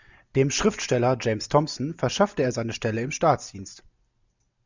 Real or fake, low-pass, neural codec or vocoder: real; 7.2 kHz; none